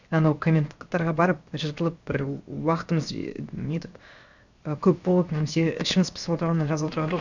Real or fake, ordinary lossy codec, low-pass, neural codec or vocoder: fake; none; 7.2 kHz; codec, 16 kHz, 0.7 kbps, FocalCodec